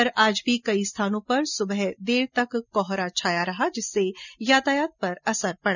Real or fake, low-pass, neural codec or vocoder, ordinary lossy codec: real; 7.2 kHz; none; none